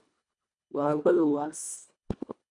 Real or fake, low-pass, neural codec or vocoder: fake; 10.8 kHz; codec, 24 kHz, 1.5 kbps, HILCodec